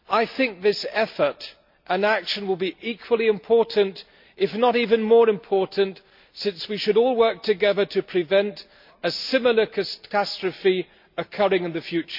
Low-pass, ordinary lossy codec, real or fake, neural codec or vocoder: 5.4 kHz; MP3, 48 kbps; real; none